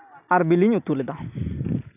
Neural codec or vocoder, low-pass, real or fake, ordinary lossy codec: none; 3.6 kHz; real; none